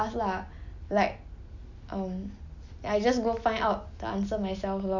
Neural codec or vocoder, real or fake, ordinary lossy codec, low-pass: none; real; none; 7.2 kHz